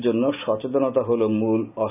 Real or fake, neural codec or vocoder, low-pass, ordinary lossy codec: real; none; 3.6 kHz; none